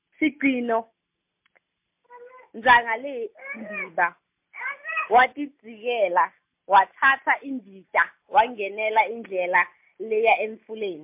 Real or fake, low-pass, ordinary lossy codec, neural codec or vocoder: real; 3.6 kHz; MP3, 32 kbps; none